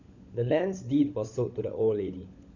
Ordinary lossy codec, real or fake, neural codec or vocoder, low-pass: none; fake; codec, 16 kHz, 16 kbps, FunCodec, trained on LibriTTS, 50 frames a second; 7.2 kHz